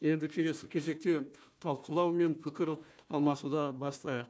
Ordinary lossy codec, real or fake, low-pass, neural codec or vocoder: none; fake; none; codec, 16 kHz, 1 kbps, FunCodec, trained on Chinese and English, 50 frames a second